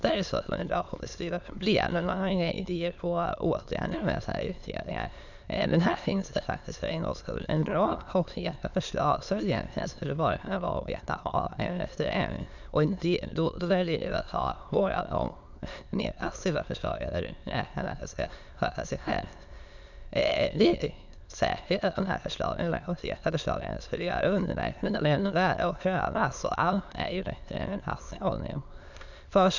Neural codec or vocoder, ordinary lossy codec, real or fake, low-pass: autoencoder, 22.05 kHz, a latent of 192 numbers a frame, VITS, trained on many speakers; none; fake; 7.2 kHz